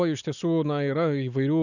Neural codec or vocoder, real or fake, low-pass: none; real; 7.2 kHz